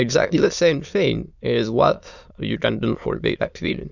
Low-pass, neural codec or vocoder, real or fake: 7.2 kHz; autoencoder, 22.05 kHz, a latent of 192 numbers a frame, VITS, trained on many speakers; fake